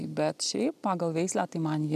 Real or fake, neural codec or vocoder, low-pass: real; none; 14.4 kHz